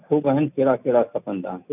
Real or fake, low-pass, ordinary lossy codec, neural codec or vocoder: real; 3.6 kHz; none; none